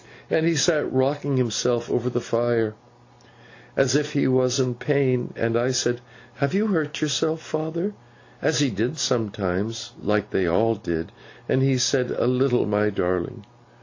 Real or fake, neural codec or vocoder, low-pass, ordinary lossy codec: real; none; 7.2 kHz; AAC, 48 kbps